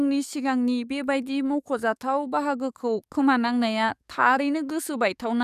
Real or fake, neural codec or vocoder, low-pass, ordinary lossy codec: fake; codec, 44.1 kHz, 7.8 kbps, DAC; 14.4 kHz; none